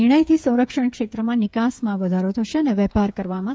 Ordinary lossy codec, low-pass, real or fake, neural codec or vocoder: none; none; fake; codec, 16 kHz, 8 kbps, FreqCodec, smaller model